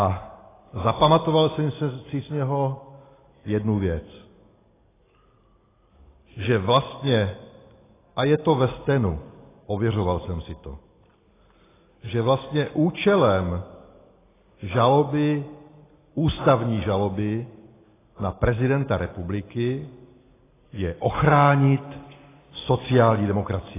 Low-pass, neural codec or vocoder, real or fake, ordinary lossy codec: 3.6 kHz; none; real; AAC, 16 kbps